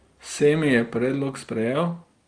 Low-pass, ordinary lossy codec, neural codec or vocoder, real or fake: 9.9 kHz; Opus, 32 kbps; none; real